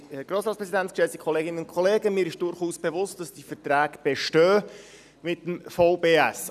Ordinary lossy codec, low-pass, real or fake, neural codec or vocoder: none; 14.4 kHz; real; none